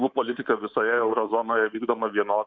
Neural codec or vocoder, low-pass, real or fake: codec, 24 kHz, 3.1 kbps, DualCodec; 7.2 kHz; fake